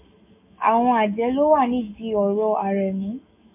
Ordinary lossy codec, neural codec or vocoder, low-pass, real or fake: MP3, 32 kbps; autoencoder, 48 kHz, 128 numbers a frame, DAC-VAE, trained on Japanese speech; 3.6 kHz; fake